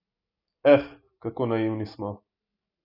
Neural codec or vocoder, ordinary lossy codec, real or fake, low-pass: none; none; real; 5.4 kHz